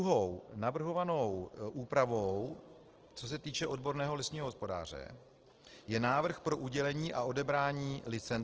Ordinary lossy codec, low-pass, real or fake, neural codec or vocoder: Opus, 16 kbps; 7.2 kHz; real; none